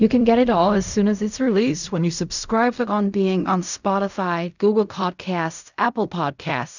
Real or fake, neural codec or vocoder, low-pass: fake; codec, 16 kHz in and 24 kHz out, 0.4 kbps, LongCat-Audio-Codec, fine tuned four codebook decoder; 7.2 kHz